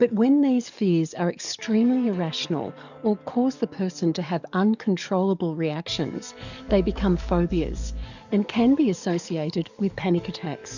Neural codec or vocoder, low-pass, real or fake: codec, 44.1 kHz, 7.8 kbps, DAC; 7.2 kHz; fake